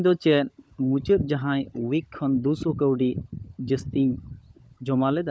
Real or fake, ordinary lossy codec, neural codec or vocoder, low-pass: fake; none; codec, 16 kHz, 16 kbps, FunCodec, trained on LibriTTS, 50 frames a second; none